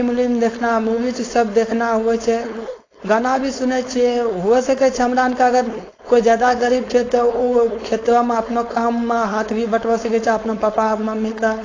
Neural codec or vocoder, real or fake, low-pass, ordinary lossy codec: codec, 16 kHz, 4.8 kbps, FACodec; fake; 7.2 kHz; AAC, 32 kbps